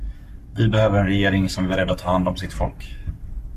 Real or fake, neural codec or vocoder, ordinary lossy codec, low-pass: fake; codec, 44.1 kHz, 7.8 kbps, Pupu-Codec; MP3, 96 kbps; 14.4 kHz